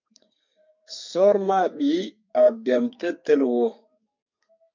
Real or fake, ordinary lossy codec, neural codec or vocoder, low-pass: fake; MP3, 64 kbps; codec, 32 kHz, 1.9 kbps, SNAC; 7.2 kHz